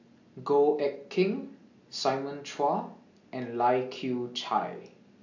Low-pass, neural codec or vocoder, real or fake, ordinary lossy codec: 7.2 kHz; none; real; AAC, 48 kbps